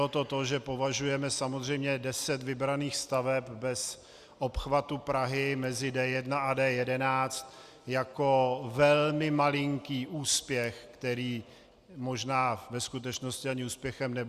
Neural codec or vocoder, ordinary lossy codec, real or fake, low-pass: none; Opus, 64 kbps; real; 14.4 kHz